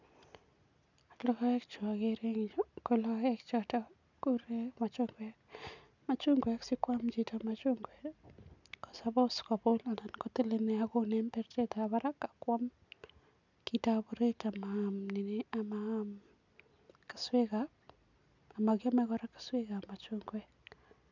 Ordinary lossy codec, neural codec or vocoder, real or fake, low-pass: none; none; real; 7.2 kHz